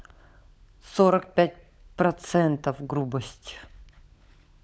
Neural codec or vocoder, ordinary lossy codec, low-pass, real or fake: codec, 16 kHz, 16 kbps, FunCodec, trained on LibriTTS, 50 frames a second; none; none; fake